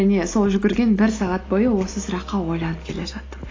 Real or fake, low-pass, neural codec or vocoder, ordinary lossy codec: real; 7.2 kHz; none; AAC, 32 kbps